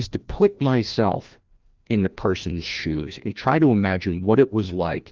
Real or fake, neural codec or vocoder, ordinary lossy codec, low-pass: fake; codec, 16 kHz, 1 kbps, FreqCodec, larger model; Opus, 24 kbps; 7.2 kHz